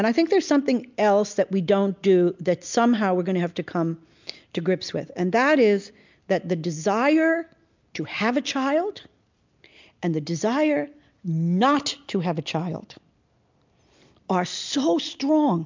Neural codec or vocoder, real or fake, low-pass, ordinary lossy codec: none; real; 7.2 kHz; MP3, 64 kbps